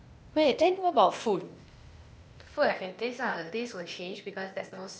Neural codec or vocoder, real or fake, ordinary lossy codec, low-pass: codec, 16 kHz, 0.8 kbps, ZipCodec; fake; none; none